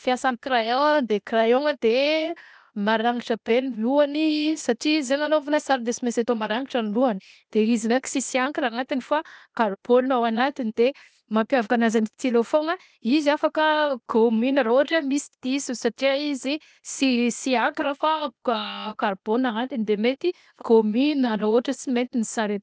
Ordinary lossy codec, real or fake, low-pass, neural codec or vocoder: none; fake; none; codec, 16 kHz, 0.8 kbps, ZipCodec